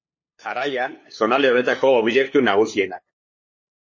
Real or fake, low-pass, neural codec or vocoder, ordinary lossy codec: fake; 7.2 kHz; codec, 16 kHz, 2 kbps, FunCodec, trained on LibriTTS, 25 frames a second; MP3, 32 kbps